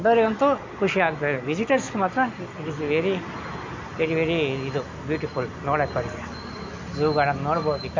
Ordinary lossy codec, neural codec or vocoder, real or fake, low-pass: MP3, 48 kbps; none; real; 7.2 kHz